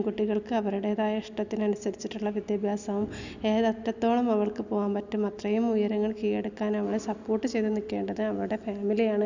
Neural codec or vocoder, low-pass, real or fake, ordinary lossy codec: none; 7.2 kHz; real; none